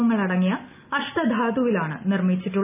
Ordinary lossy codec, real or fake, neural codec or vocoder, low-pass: none; real; none; 3.6 kHz